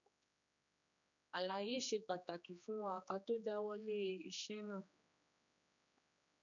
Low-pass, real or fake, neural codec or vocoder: 7.2 kHz; fake; codec, 16 kHz, 2 kbps, X-Codec, HuBERT features, trained on general audio